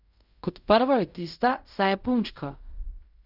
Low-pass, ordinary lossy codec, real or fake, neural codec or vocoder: 5.4 kHz; none; fake; codec, 16 kHz in and 24 kHz out, 0.4 kbps, LongCat-Audio-Codec, fine tuned four codebook decoder